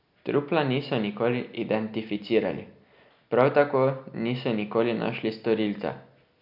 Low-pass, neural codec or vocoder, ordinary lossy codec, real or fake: 5.4 kHz; none; none; real